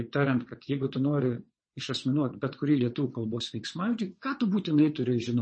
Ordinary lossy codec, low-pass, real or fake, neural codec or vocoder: MP3, 32 kbps; 9.9 kHz; fake; vocoder, 22.05 kHz, 80 mel bands, WaveNeXt